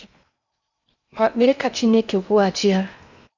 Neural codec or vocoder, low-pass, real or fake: codec, 16 kHz in and 24 kHz out, 0.8 kbps, FocalCodec, streaming, 65536 codes; 7.2 kHz; fake